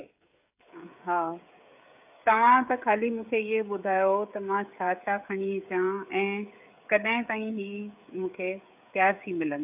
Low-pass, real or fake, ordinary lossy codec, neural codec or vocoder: 3.6 kHz; fake; AAC, 32 kbps; codec, 16 kHz, 16 kbps, FreqCodec, smaller model